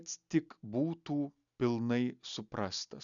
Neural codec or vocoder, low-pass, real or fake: none; 7.2 kHz; real